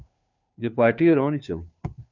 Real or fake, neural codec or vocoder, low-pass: fake; codec, 16 kHz, 2 kbps, FunCodec, trained on Chinese and English, 25 frames a second; 7.2 kHz